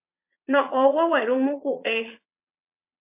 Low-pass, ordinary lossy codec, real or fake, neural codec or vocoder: 3.6 kHz; MP3, 32 kbps; fake; vocoder, 22.05 kHz, 80 mel bands, Vocos